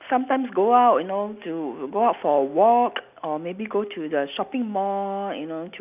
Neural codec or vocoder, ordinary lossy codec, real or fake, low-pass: none; none; real; 3.6 kHz